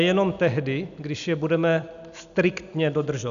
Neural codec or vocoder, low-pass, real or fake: none; 7.2 kHz; real